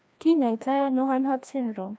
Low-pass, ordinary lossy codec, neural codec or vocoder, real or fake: none; none; codec, 16 kHz, 1 kbps, FreqCodec, larger model; fake